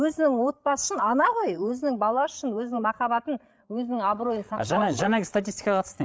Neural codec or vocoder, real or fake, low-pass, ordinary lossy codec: codec, 16 kHz, 8 kbps, FreqCodec, larger model; fake; none; none